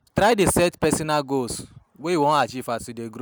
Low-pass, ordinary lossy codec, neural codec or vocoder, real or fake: none; none; none; real